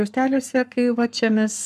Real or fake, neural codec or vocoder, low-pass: fake; codec, 44.1 kHz, 7.8 kbps, Pupu-Codec; 14.4 kHz